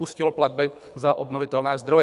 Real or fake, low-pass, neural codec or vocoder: fake; 10.8 kHz; codec, 24 kHz, 3 kbps, HILCodec